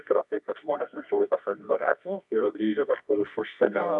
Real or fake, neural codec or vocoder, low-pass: fake; codec, 24 kHz, 0.9 kbps, WavTokenizer, medium music audio release; 10.8 kHz